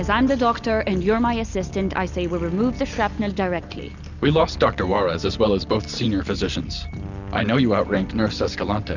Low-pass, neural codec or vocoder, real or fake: 7.2 kHz; none; real